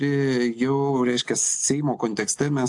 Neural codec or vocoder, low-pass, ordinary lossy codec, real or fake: none; 10.8 kHz; AAC, 64 kbps; real